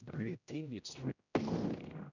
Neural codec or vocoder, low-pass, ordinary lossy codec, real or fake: codec, 16 kHz, 0.5 kbps, X-Codec, HuBERT features, trained on general audio; 7.2 kHz; none; fake